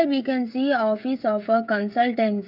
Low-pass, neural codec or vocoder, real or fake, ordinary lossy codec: 5.4 kHz; codec, 16 kHz, 16 kbps, FreqCodec, smaller model; fake; none